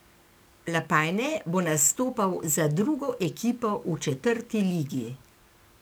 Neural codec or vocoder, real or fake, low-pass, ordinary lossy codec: codec, 44.1 kHz, 7.8 kbps, DAC; fake; none; none